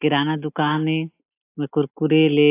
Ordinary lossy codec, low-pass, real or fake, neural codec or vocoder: AAC, 24 kbps; 3.6 kHz; real; none